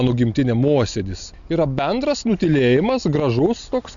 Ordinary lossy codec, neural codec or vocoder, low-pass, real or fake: MP3, 96 kbps; none; 7.2 kHz; real